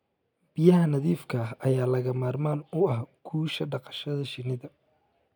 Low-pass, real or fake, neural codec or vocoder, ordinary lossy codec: 19.8 kHz; real; none; none